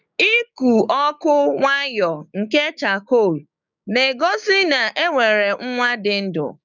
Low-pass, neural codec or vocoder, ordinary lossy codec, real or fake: 7.2 kHz; codec, 16 kHz, 6 kbps, DAC; none; fake